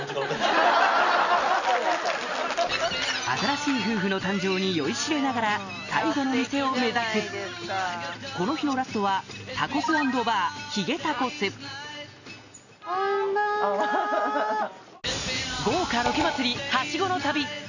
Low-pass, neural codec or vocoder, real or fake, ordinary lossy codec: 7.2 kHz; none; real; none